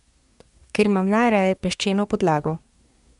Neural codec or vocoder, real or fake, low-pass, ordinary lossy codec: codec, 24 kHz, 1 kbps, SNAC; fake; 10.8 kHz; MP3, 96 kbps